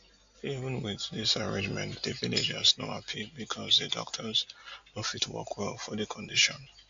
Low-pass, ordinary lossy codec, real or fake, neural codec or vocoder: 7.2 kHz; AAC, 64 kbps; real; none